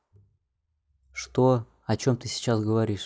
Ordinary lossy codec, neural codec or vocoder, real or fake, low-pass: none; none; real; none